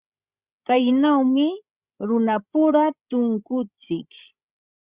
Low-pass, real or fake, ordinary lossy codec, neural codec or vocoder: 3.6 kHz; fake; Opus, 64 kbps; codec, 16 kHz, 16 kbps, FreqCodec, larger model